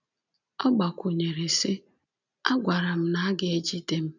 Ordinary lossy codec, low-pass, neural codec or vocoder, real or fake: none; 7.2 kHz; none; real